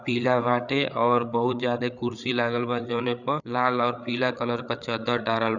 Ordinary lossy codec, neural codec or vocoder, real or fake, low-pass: none; codec, 16 kHz, 8 kbps, FreqCodec, larger model; fake; 7.2 kHz